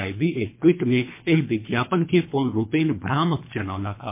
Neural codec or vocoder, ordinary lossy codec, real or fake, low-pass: codec, 24 kHz, 3 kbps, HILCodec; MP3, 24 kbps; fake; 3.6 kHz